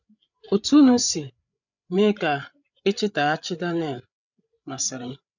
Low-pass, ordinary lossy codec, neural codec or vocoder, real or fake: 7.2 kHz; none; codec, 16 kHz, 8 kbps, FreqCodec, larger model; fake